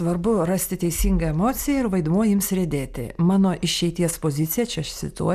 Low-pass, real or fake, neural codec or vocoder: 14.4 kHz; real; none